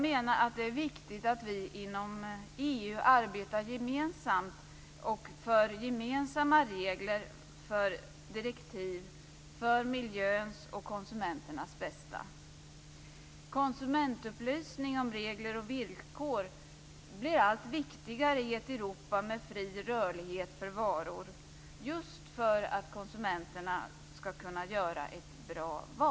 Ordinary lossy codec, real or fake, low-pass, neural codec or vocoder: none; real; none; none